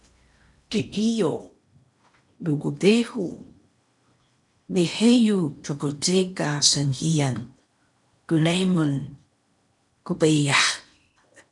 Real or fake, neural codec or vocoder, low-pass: fake; codec, 16 kHz in and 24 kHz out, 0.8 kbps, FocalCodec, streaming, 65536 codes; 10.8 kHz